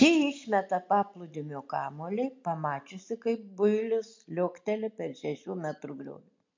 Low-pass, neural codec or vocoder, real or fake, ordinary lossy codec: 7.2 kHz; none; real; MP3, 48 kbps